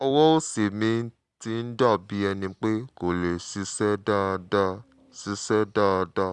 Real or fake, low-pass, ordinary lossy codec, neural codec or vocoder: real; 10.8 kHz; Opus, 64 kbps; none